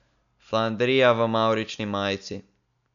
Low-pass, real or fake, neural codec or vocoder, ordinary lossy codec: 7.2 kHz; real; none; none